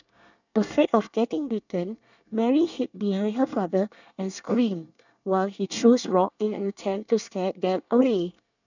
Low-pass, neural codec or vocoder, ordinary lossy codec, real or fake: 7.2 kHz; codec, 24 kHz, 1 kbps, SNAC; none; fake